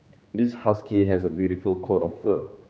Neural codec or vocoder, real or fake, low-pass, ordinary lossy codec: codec, 16 kHz, 2 kbps, X-Codec, HuBERT features, trained on general audio; fake; none; none